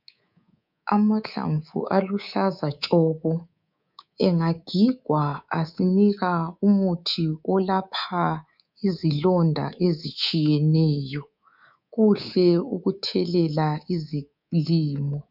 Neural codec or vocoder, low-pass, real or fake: codec, 24 kHz, 3.1 kbps, DualCodec; 5.4 kHz; fake